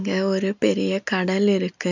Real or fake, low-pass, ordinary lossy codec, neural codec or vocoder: real; 7.2 kHz; none; none